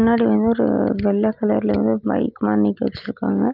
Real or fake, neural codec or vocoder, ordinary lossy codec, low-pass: real; none; Opus, 32 kbps; 5.4 kHz